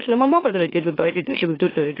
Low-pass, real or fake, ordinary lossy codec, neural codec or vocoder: 5.4 kHz; fake; AAC, 24 kbps; autoencoder, 44.1 kHz, a latent of 192 numbers a frame, MeloTTS